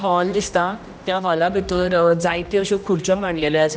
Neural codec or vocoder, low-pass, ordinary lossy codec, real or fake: codec, 16 kHz, 1 kbps, X-Codec, HuBERT features, trained on general audio; none; none; fake